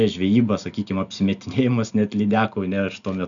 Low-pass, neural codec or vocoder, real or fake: 7.2 kHz; none; real